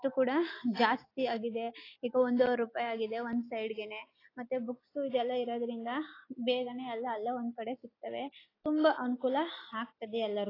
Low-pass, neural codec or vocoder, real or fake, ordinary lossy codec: 5.4 kHz; none; real; AAC, 24 kbps